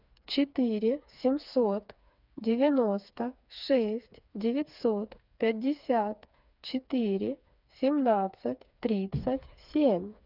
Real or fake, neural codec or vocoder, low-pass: fake; codec, 16 kHz, 4 kbps, FreqCodec, smaller model; 5.4 kHz